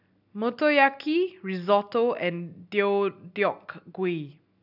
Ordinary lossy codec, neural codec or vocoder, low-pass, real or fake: none; none; 5.4 kHz; real